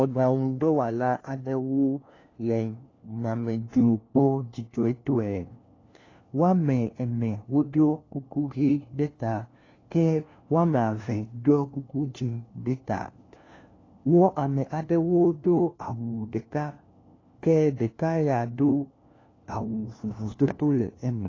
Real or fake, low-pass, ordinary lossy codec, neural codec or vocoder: fake; 7.2 kHz; AAC, 32 kbps; codec, 16 kHz, 1 kbps, FunCodec, trained on LibriTTS, 50 frames a second